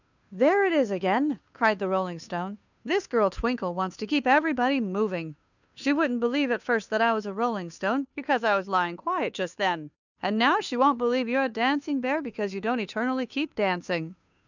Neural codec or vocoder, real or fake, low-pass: codec, 16 kHz, 2 kbps, FunCodec, trained on Chinese and English, 25 frames a second; fake; 7.2 kHz